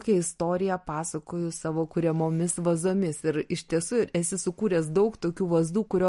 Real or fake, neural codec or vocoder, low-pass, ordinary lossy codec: real; none; 14.4 kHz; MP3, 48 kbps